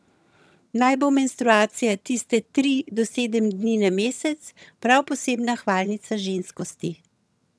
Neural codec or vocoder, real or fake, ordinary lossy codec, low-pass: vocoder, 22.05 kHz, 80 mel bands, HiFi-GAN; fake; none; none